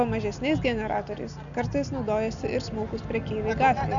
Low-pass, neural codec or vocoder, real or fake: 7.2 kHz; none; real